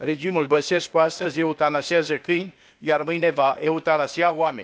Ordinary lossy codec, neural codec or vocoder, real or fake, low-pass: none; codec, 16 kHz, 0.8 kbps, ZipCodec; fake; none